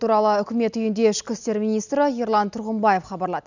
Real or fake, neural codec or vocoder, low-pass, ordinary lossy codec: real; none; 7.2 kHz; none